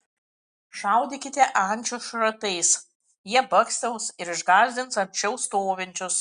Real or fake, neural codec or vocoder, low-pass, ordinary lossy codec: real; none; 9.9 kHz; Opus, 64 kbps